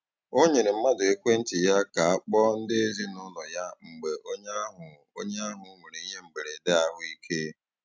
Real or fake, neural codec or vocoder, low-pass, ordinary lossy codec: real; none; none; none